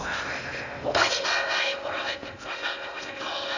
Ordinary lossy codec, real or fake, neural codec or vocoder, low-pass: none; fake; codec, 16 kHz in and 24 kHz out, 0.6 kbps, FocalCodec, streaming, 2048 codes; 7.2 kHz